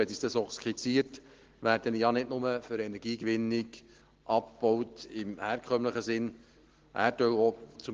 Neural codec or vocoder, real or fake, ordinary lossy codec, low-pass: none; real; Opus, 16 kbps; 7.2 kHz